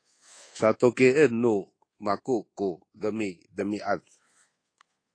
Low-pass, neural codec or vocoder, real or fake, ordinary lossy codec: 9.9 kHz; codec, 24 kHz, 1.2 kbps, DualCodec; fake; AAC, 32 kbps